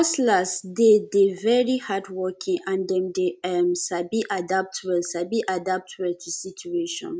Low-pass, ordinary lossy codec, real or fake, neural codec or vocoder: none; none; real; none